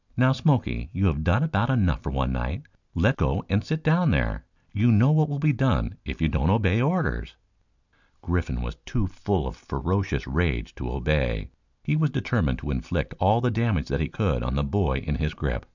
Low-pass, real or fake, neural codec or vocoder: 7.2 kHz; real; none